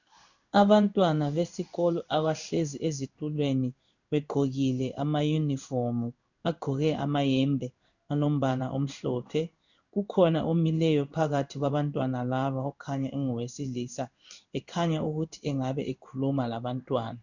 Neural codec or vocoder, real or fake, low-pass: codec, 16 kHz in and 24 kHz out, 1 kbps, XY-Tokenizer; fake; 7.2 kHz